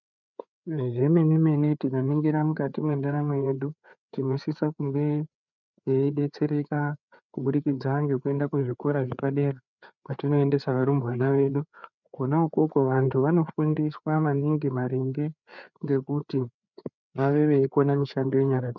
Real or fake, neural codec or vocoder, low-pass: fake; codec, 16 kHz, 4 kbps, FreqCodec, larger model; 7.2 kHz